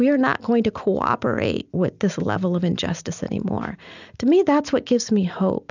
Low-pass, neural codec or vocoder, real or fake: 7.2 kHz; none; real